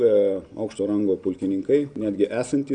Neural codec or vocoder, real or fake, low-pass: none; real; 9.9 kHz